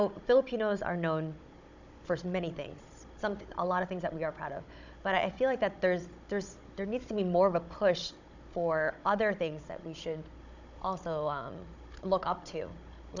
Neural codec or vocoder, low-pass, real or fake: codec, 16 kHz, 16 kbps, FunCodec, trained on Chinese and English, 50 frames a second; 7.2 kHz; fake